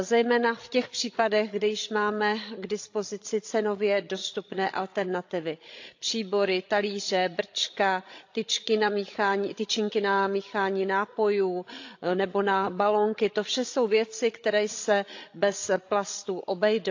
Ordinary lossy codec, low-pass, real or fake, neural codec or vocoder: AAC, 48 kbps; 7.2 kHz; fake; codec, 16 kHz, 16 kbps, FreqCodec, larger model